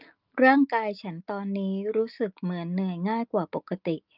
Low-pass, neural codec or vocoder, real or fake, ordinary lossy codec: 5.4 kHz; none; real; Opus, 24 kbps